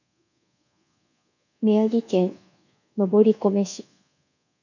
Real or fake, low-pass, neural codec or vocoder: fake; 7.2 kHz; codec, 24 kHz, 1.2 kbps, DualCodec